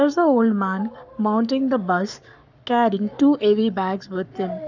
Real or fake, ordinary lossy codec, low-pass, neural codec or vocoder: fake; none; 7.2 kHz; codec, 44.1 kHz, 7.8 kbps, Pupu-Codec